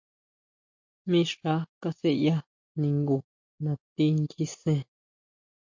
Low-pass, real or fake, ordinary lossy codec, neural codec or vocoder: 7.2 kHz; real; MP3, 48 kbps; none